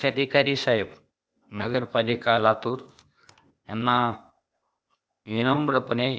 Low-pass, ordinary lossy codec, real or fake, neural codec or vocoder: none; none; fake; codec, 16 kHz, 0.8 kbps, ZipCodec